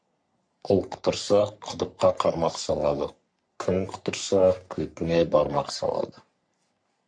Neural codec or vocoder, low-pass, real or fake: codec, 44.1 kHz, 3.4 kbps, Pupu-Codec; 9.9 kHz; fake